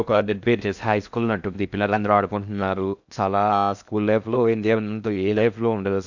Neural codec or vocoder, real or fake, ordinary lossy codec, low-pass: codec, 16 kHz in and 24 kHz out, 0.6 kbps, FocalCodec, streaming, 2048 codes; fake; none; 7.2 kHz